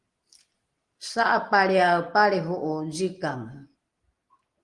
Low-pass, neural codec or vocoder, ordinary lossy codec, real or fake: 10.8 kHz; none; Opus, 24 kbps; real